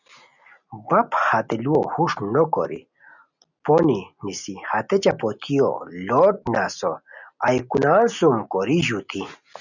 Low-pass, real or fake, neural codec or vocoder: 7.2 kHz; real; none